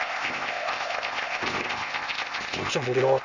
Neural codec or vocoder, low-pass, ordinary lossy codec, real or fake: codec, 16 kHz, 0.8 kbps, ZipCodec; 7.2 kHz; Opus, 64 kbps; fake